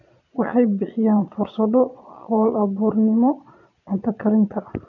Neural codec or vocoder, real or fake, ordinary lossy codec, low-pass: vocoder, 22.05 kHz, 80 mel bands, Vocos; fake; none; 7.2 kHz